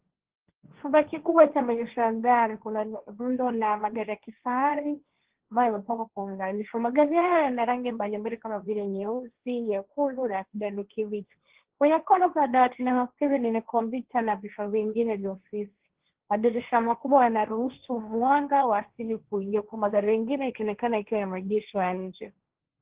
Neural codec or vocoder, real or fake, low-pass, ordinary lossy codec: codec, 16 kHz, 1.1 kbps, Voila-Tokenizer; fake; 3.6 kHz; Opus, 16 kbps